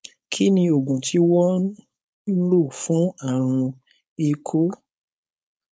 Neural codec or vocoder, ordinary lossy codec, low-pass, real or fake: codec, 16 kHz, 4.8 kbps, FACodec; none; none; fake